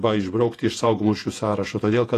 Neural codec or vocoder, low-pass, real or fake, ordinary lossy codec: vocoder, 48 kHz, 128 mel bands, Vocos; 14.4 kHz; fake; AAC, 48 kbps